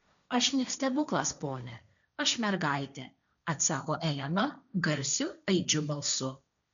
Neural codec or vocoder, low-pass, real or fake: codec, 16 kHz, 1.1 kbps, Voila-Tokenizer; 7.2 kHz; fake